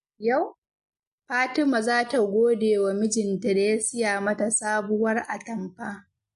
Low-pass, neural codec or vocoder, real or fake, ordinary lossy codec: 14.4 kHz; none; real; MP3, 48 kbps